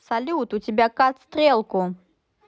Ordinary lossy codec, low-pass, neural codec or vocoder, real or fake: none; none; none; real